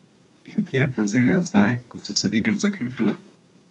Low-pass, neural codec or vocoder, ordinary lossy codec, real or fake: 10.8 kHz; codec, 24 kHz, 1 kbps, SNAC; none; fake